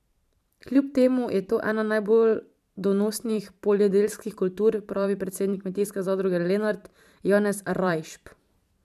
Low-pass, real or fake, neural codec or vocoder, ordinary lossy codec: 14.4 kHz; fake; vocoder, 44.1 kHz, 128 mel bands every 512 samples, BigVGAN v2; none